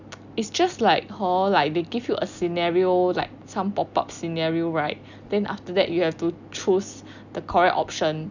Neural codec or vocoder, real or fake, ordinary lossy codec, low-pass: none; real; none; 7.2 kHz